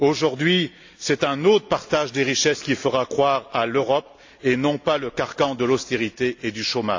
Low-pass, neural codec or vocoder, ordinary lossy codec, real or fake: 7.2 kHz; none; AAC, 48 kbps; real